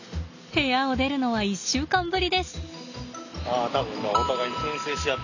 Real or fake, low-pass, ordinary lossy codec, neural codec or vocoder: real; 7.2 kHz; none; none